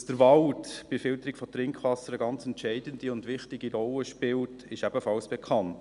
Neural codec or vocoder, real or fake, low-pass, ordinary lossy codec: none; real; 10.8 kHz; none